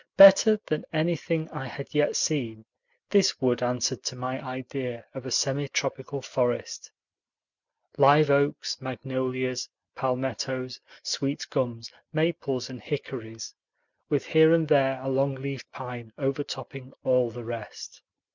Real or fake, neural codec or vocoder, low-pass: real; none; 7.2 kHz